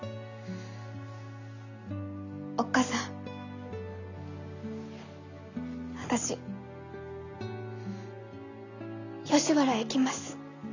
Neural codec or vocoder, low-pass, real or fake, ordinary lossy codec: none; 7.2 kHz; real; none